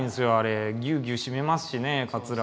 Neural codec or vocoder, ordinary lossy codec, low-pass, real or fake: none; none; none; real